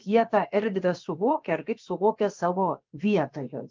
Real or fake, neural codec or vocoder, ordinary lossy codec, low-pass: fake; codec, 16 kHz, about 1 kbps, DyCAST, with the encoder's durations; Opus, 32 kbps; 7.2 kHz